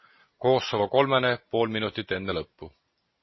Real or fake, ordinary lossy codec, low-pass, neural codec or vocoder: real; MP3, 24 kbps; 7.2 kHz; none